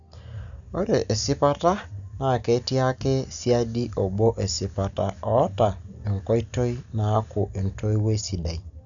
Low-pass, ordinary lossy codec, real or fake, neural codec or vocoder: 7.2 kHz; none; real; none